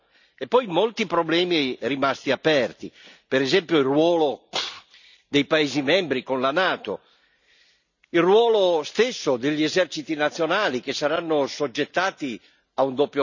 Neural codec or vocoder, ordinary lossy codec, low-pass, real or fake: none; none; 7.2 kHz; real